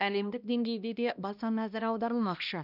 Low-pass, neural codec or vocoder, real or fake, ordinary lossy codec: 5.4 kHz; codec, 16 kHz, 1 kbps, X-Codec, HuBERT features, trained on balanced general audio; fake; none